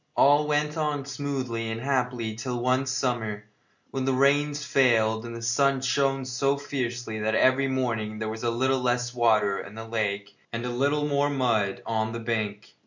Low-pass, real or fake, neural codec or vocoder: 7.2 kHz; real; none